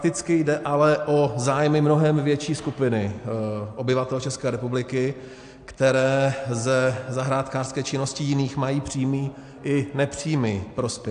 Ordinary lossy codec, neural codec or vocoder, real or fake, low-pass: AAC, 64 kbps; none; real; 9.9 kHz